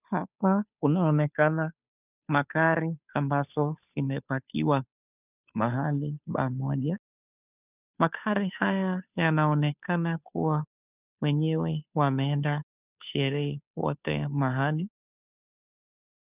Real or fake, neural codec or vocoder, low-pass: fake; codec, 16 kHz, 2 kbps, FunCodec, trained on Chinese and English, 25 frames a second; 3.6 kHz